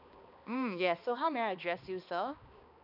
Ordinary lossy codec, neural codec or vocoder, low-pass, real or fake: none; codec, 16 kHz, 2 kbps, X-Codec, HuBERT features, trained on balanced general audio; 5.4 kHz; fake